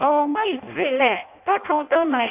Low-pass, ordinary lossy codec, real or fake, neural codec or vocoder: 3.6 kHz; none; fake; codec, 16 kHz in and 24 kHz out, 0.6 kbps, FireRedTTS-2 codec